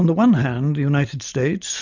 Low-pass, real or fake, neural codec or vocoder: 7.2 kHz; real; none